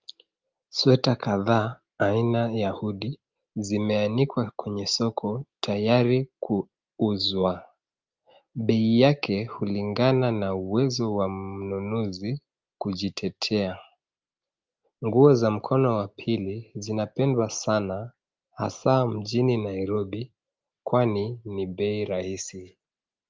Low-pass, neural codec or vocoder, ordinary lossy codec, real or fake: 7.2 kHz; none; Opus, 24 kbps; real